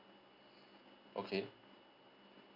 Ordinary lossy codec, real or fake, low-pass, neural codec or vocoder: AAC, 48 kbps; real; 5.4 kHz; none